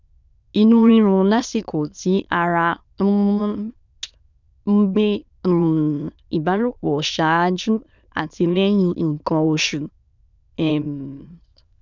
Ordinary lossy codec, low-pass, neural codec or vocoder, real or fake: none; 7.2 kHz; autoencoder, 22.05 kHz, a latent of 192 numbers a frame, VITS, trained on many speakers; fake